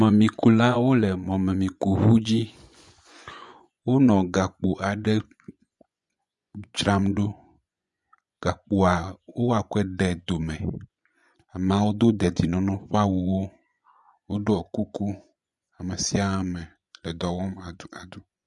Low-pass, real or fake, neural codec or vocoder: 10.8 kHz; fake; vocoder, 24 kHz, 100 mel bands, Vocos